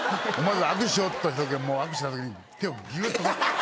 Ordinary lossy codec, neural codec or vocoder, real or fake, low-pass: none; none; real; none